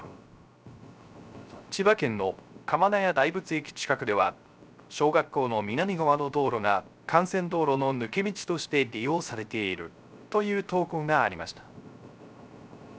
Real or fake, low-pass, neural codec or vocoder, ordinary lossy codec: fake; none; codec, 16 kHz, 0.3 kbps, FocalCodec; none